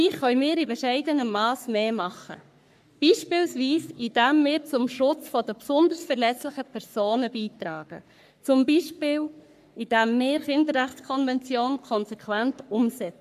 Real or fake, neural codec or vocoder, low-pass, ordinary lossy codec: fake; codec, 44.1 kHz, 3.4 kbps, Pupu-Codec; 14.4 kHz; AAC, 96 kbps